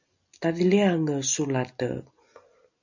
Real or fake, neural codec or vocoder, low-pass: real; none; 7.2 kHz